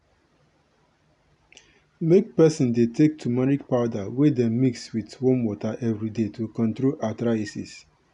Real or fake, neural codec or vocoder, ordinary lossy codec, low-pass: real; none; none; 10.8 kHz